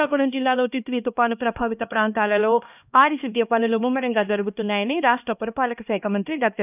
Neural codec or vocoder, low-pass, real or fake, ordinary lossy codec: codec, 16 kHz, 1 kbps, X-Codec, HuBERT features, trained on LibriSpeech; 3.6 kHz; fake; none